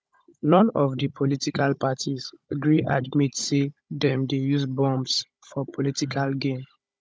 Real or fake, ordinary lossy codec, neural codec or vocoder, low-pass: fake; none; codec, 16 kHz, 16 kbps, FunCodec, trained on Chinese and English, 50 frames a second; none